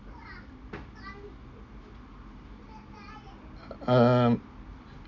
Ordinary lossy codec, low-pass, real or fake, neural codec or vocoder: none; 7.2 kHz; real; none